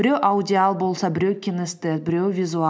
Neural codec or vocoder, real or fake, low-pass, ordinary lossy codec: none; real; none; none